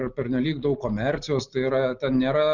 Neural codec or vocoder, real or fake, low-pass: none; real; 7.2 kHz